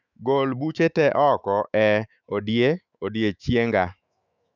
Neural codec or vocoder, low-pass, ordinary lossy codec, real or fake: autoencoder, 48 kHz, 128 numbers a frame, DAC-VAE, trained on Japanese speech; 7.2 kHz; none; fake